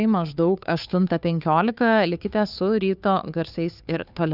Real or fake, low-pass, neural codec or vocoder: fake; 5.4 kHz; codec, 16 kHz, 2 kbps, FunCodec, trained on Chinese and English, 25 frames a second